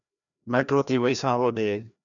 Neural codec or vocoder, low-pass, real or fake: codec, 16 kHz, 1 kbps, FreqCodec, larger model; 7.2 kHz; fake